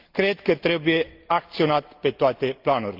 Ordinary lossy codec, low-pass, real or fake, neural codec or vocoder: Opus, 24 kbps; 5.4 kHz; real; none